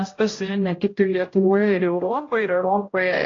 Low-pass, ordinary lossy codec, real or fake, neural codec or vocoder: 7.2 kHz; AAC, 32 kbps; fake; codec, 16 kHz, 0.5 kbps, X-Codec, HuBERT features, trained on general audio